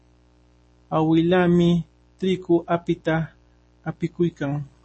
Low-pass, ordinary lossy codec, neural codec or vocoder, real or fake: 9.9 kHz; MP3, 32 kbps; none; real